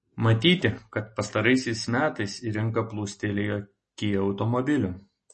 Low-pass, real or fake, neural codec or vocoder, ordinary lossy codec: 10.8 kHz; real; none; MP3, 32 kbps